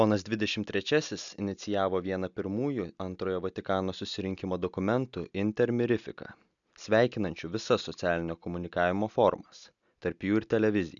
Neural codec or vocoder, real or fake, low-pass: none; real; 7.2 kHz